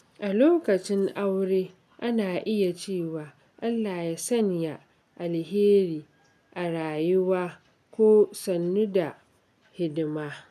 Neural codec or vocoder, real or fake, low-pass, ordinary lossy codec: none; real; 14.4 kHz; none